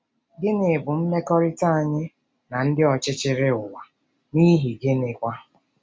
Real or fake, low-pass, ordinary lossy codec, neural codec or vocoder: real; none; none; none